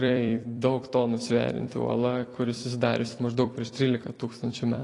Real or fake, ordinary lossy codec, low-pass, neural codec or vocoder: fake; AAC, 32 kbps; 10.8 kHz; vocoder, 44.1 kHz, 128 mel bands every 256 samples, BigVGAN v2